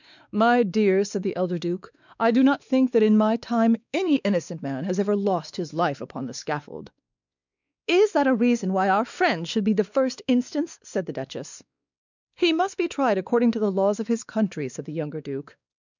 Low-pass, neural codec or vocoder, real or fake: 7.2 kHz; codec, 16 kHz, 2 kbps, X-Codec, WavLM features, trained on Multilingual LibriSpeech; fake